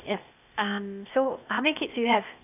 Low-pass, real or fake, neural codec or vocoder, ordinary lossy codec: 3.6 kHz; fake; codec, 16 kHz, 0.8 kbps, ZipCodec; none